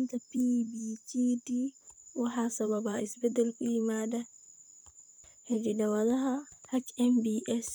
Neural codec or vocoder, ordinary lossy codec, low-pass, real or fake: vocoder, 44.1 kHz, 128 mel bands, Pupu-Vocoder; none; none; fake